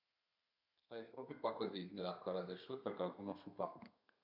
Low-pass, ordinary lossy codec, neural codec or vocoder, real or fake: 5.4 kHz; none; codec, 32 kHz, 1.9 kbps, SNAC; fake